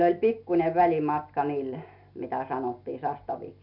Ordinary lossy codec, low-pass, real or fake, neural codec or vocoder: none; 5.4 kHz; real; none